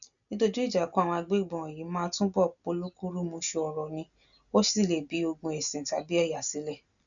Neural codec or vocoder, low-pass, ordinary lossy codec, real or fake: none; 7.2 kHz; none; real